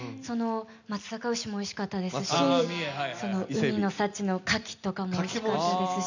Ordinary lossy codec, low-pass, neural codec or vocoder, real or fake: none; 7.2 kHz; none; real